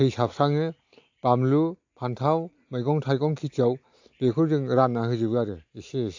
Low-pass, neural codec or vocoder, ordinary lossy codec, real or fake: 7.2 kHz; none; AAC, 48 kbps; real